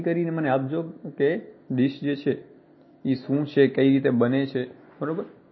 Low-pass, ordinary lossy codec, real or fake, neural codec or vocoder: 7.2 kHz; MP3, 24 kbps; real; none